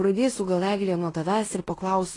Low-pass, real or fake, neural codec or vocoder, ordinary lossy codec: 10.8 kHz; fake; codec, 16 kHz in and 24 kHz out, 0.9 kbps, LongCat-Audio-Codec, fine tuned four codebook decoder; AAC, 32 kbps